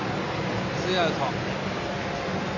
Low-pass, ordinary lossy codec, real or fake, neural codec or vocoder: 7.2 kHz; AAC, 48 kbps; real; none